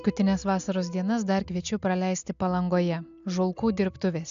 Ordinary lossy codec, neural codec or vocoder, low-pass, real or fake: AAC, 96 kbps; none; 7.2 kHz; real